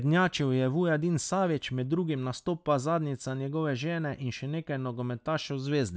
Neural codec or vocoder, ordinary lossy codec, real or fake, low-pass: none; none; real; none